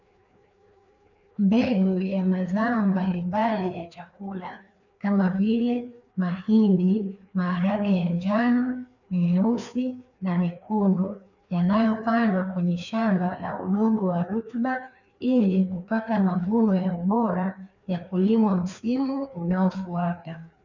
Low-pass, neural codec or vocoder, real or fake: 7.2 kHz; codec, 16 kHz, 2 kbps, FreqCodec, larger model; fake